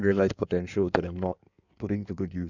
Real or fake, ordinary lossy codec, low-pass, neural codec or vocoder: fake; none; 7.2 kHz; codec, 16 kHz in and 24 kHz out, 1.1 kbps, FireRedTTS-2 codec